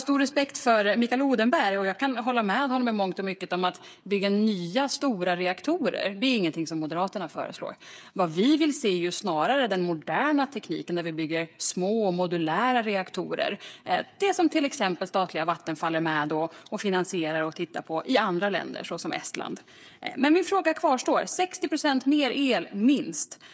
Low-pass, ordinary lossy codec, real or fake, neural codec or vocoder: none; none; fake; codec, 16 kHz, 8 kbps, FreqCodec, smaller model